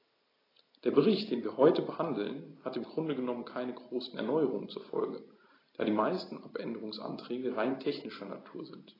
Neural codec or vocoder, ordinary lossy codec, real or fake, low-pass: none; AAC, 24 kbps; real; 5.4 kHz